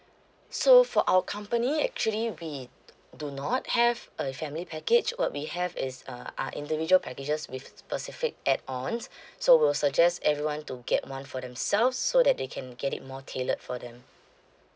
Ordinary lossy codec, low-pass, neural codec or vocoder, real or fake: none; none; none; real